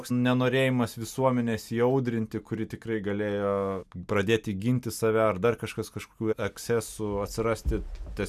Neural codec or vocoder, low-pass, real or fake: none; 14.4 kHz; real